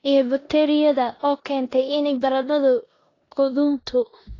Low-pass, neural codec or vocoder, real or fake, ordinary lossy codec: 7.2 kHz; codec, 16 kHz in and 24 kHz out, 0.9 kbps, LongCat-Audio-Codec, four codebook decoder; fake; AAC, 32 kbps